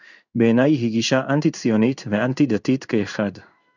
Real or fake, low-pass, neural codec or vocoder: fake; 7.2 kHz; codec, 16 kHz in and 24 kHz out, 1 kbps, XY-Tokenizer